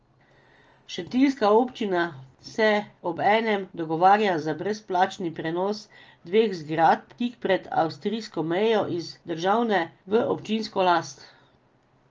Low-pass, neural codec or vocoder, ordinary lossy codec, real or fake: 7.2 kHz; none; Opus, 24 kbps; real